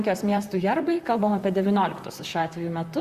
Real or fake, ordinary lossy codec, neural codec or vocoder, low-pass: fake; Opus, 64 kbps; vocoder, 44.1 kHz, 128 mel bands, Pupu-Vocoder; 14.4 kHz